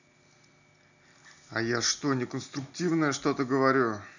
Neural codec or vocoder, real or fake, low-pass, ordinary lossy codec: none; real; 7.2 kHz; none